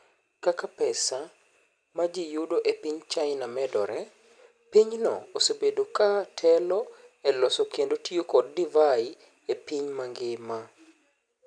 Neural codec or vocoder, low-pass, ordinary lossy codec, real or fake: none; 9.9 kHz; none; real